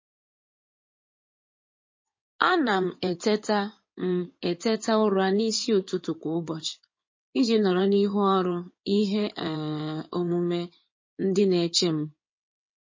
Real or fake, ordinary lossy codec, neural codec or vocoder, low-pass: fake; MP3, 32 kbps; codec, 16 kHz in and 24 kHz out, 2.2 kbps, FireRedTTS-2 codec; 7.2 kHz